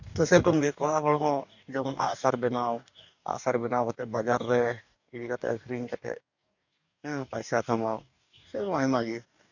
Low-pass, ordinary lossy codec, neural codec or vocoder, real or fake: 7.2 kHz; none; codec, 44.1 kHz, 2.6 kbps, DAC; fake